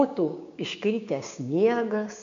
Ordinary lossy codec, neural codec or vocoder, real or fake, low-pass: MP3, 64 kbps; codec, 16 kHz, 6 kbps, DAC; fake; 7.2 kHz